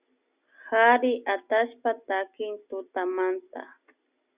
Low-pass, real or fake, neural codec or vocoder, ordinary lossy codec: 3.6 kHz; real; none; Opus, 64 kbps